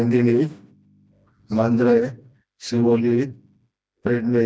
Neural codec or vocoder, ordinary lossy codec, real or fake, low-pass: codec, 16 kHz, 1 kbps, FreqCodec, smaller model; none; fake; none